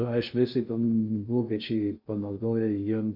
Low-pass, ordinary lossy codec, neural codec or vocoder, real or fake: 5.4 kHz; Opus, 64 kbps; codec, 16 kHz in and 24 kHz out, 0.6 kbps, FocalCodec, streaming, 2048 codes; fake